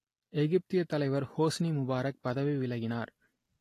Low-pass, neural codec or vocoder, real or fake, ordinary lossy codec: 14.4 kHz; none; real; AAC, 48 kbps